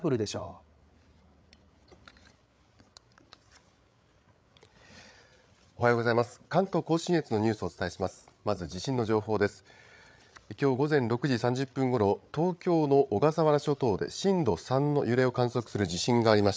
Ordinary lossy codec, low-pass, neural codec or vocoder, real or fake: none; none; codec, 16 kHz, 8 kbps, FreqCodec, larger model; fake